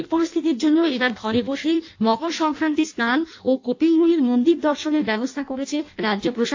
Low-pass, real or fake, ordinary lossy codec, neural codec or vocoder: 7.2 kHz; fake; AAC, 48 kbps; codec, 16 kHz in and 24 kHz out, 0.6 kbps, FireRedTTS-2 codec